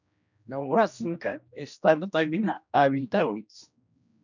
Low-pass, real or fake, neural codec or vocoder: 7.2 kHz; fake; codec, 16 kHz, 1 kbps, X-Codec, HuBERT features, trained on general audio